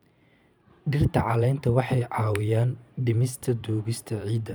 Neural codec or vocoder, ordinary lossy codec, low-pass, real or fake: vocoder, 44.1 kHz, 128 mel bands every 512 samples, BigVGAN v2; none; none; fake